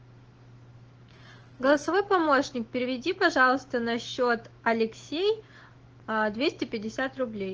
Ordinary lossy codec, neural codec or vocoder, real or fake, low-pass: Opus, 16 kbps; autoencoder, 48 kHz, 128 numbers a frame, DAC-VAE, trained on Japanese speech; fake; 7.2 kHz